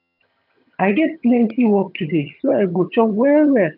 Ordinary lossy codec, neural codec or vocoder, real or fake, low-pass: none; vocoder, 22.05 kHz, 80 mel bands, HiFi-GAN; fake; 5.4 kHz